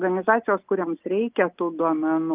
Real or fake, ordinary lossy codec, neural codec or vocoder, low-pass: real; Opus, 32 kbps; none; 3.6 kHz